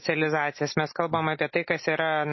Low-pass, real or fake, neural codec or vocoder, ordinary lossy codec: 7.2 kHz; real; none; MP3, 24 kbps